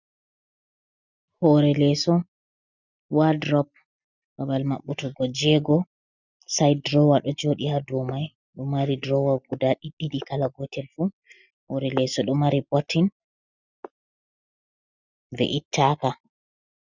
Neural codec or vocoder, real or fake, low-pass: none; real; 7.2 kHz